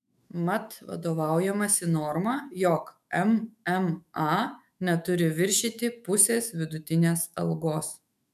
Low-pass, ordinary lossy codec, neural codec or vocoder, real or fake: 14.4 kHz; MP3, 96 kbps; autoencoder, 48 kHz, 128 numbers a frame, DAC-VAE, trained on Japanese speech; fake